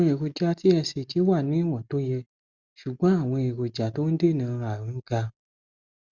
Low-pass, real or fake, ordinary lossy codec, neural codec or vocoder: 7.2 kHz; real; Opus, 64 kbps; none